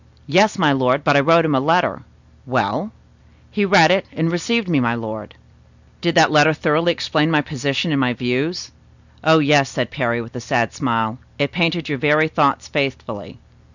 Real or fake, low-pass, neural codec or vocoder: real; 7.2 kHz; none